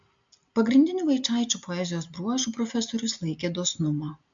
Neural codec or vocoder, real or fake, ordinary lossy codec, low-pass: none; real; MP3, 64 kbps; 7.2 kHz